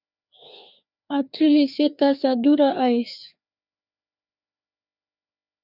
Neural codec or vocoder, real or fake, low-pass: codec, 16 kHz, 2 kbps, FreqCodec, larger model; fake; 5.4 kHz